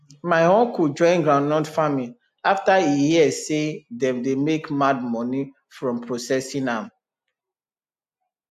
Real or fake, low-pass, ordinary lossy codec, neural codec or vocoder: real; 14.4 kHz; AAC, 96 kbps; none